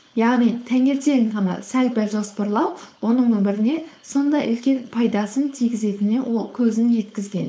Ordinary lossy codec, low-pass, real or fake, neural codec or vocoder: none; none; fake; codec, 16 kHz, 4.8 kbps, FACodec